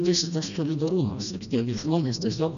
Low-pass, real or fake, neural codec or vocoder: 7.2 kHz; fake; codec, 16 kHz, 1 kbps, FreqCodec, smaller model